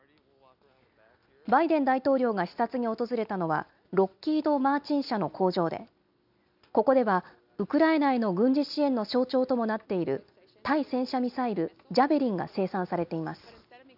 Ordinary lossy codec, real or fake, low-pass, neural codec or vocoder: none; real; 5.4 kHz; none